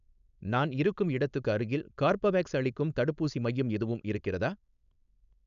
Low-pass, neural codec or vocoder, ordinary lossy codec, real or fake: 7.2 kHz; codec, 16 kHz, 4.8 kbps, FACodec; none; fake